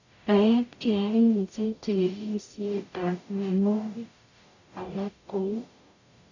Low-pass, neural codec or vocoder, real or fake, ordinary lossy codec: 7.2 kHz; codec, 44.1 kHz, 0.9 kbps, DAC; fake; none